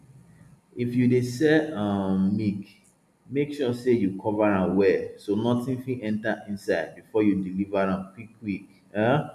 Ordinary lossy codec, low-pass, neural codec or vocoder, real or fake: none; 14.4 kHz; none; real